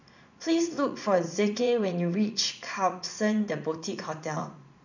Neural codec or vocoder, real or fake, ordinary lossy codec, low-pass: vocoder, 44.1 kHz, 80 mel bands, Vocos; fake; none; 7.2 kHz